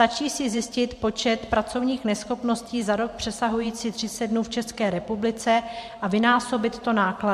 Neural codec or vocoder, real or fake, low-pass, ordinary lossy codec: vocoder, 44.1 kHz, 128 mel bands every 512 samples, BigVGAN v2; fake; 14.4 kHz; MP3, 64 kbps